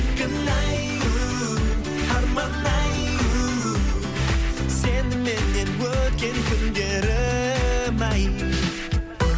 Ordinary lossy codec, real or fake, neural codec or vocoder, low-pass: none; real; none; none